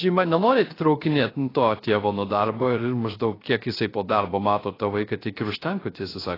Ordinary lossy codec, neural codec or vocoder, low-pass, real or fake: AAC, 24 kbps; codec, 16 kHz, 0.3 kbps, FocalCodec; 5.4 kHz; fake